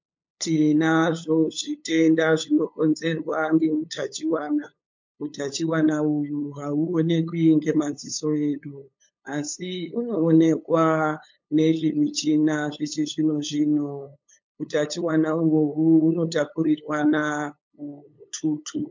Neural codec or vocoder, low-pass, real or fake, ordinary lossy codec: codec, 16 kHz, 8 kbps, FunCodec, trained on LibriTTS, 25 frames a second; 7.2 kHz; fake; MP3, 48 kbps